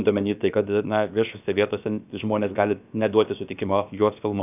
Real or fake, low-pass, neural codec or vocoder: fake; 3.6 kHz; codec, 16 kHz, about 1 kbps, DyCAST, with the encoder's durations